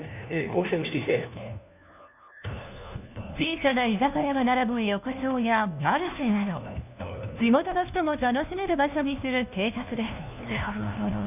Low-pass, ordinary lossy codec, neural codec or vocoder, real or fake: 3.6 kHz; none; codec, 16 kHz, 1 kbps, FunCodec, trained on LibriTTS, 50 frames a second; fake